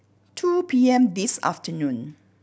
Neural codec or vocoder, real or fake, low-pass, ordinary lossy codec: none; real; none; none